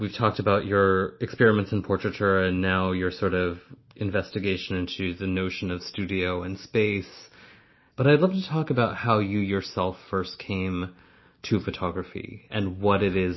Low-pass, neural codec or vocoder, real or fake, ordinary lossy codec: 7.2 kHz; none; real; MP3, 24 kbps